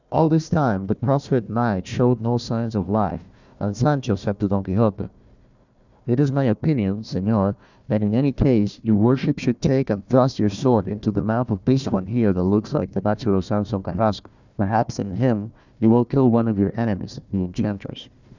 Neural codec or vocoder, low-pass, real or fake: codec, 16 kHz, 1 kbps, FunCodec, trained on Chinese and English, 50 frames a second; 7.2 kHz; fake